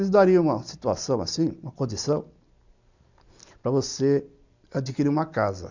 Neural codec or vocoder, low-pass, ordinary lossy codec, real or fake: none; 7.2 kHz; none; real